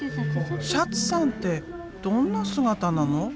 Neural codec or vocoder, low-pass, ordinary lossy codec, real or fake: none; none; none; real